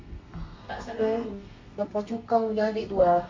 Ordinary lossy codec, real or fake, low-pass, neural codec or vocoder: MP3, 48 kbps; fake; 7.2 kHz; codec, 32 kHz, 1.9 kbps, SNAC